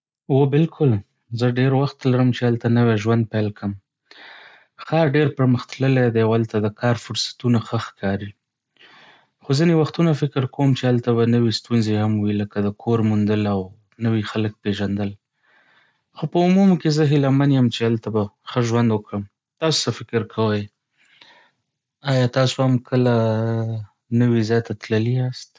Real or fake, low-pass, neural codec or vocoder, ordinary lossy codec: real; none; none; none